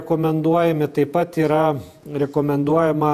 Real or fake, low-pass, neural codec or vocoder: fake; 14.4 kHz; vocoder, 48 kHz, 128 mel bands, Vocos